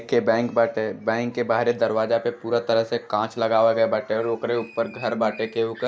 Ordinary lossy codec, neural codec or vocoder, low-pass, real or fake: none; none; none; real